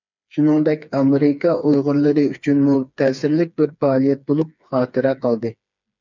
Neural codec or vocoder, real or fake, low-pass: codec, 16 kHz, 4 kbps, FreqCodec, smaller model; fake; 7.2 kHz